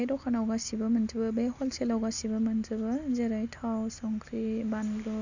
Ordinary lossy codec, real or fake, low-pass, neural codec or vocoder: none; real; 7.2 kHz; none